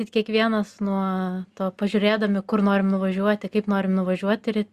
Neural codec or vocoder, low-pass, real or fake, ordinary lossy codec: none; 14.4 kHz; real; Opus, 64 kbps